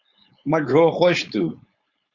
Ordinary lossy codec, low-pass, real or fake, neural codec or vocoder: Opus, 64 kbps; 7.2 kHz; fake; codec, 16 kHz, 4.8 kbps, FACodec